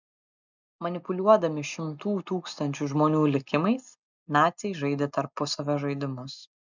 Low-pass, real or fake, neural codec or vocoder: 7.2 kHz; real; none